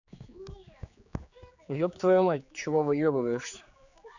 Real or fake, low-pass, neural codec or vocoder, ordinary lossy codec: fake; 7.2 kHz; codec, 16 kHz, 4 kbps, X-Codec, HuBERT features, trained on general audio; none